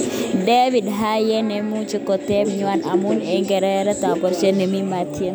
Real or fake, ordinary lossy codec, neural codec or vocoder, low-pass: real; none; none; none